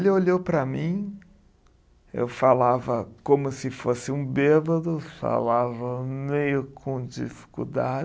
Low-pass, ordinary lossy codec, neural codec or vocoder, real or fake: none; none; none; real